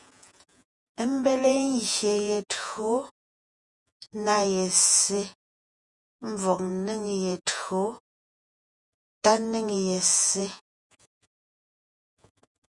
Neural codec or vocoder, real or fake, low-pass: vocoder, 48 kHz, 128 mel bands, Vocos; fake; 10.8 kHz